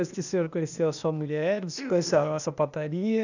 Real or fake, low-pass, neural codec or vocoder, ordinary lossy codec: fake; 7.2 kHz; codec, 16 kHz, 0.8 kbps, ZipCodec; none